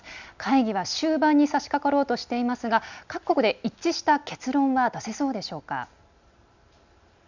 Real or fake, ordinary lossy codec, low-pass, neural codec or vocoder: real; none; 7.2 kHz; none